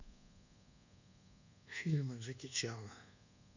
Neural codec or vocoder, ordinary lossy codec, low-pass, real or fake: codec, 24 kHz, 1.2 kbps, DualCodec; none; 7.2 kHz; fake